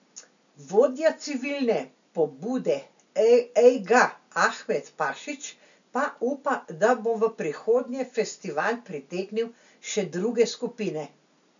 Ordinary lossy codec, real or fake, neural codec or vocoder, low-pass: none; real; none; 7.2 kHz